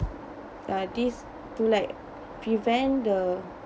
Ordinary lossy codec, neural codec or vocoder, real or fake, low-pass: none; none; real; none